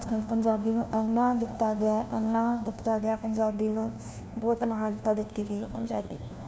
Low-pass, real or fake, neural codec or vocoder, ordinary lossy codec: none; fake; codec, 16 kHz, 1 kbps, FunCodec, trained on LibriTTS, 50 frames a second; none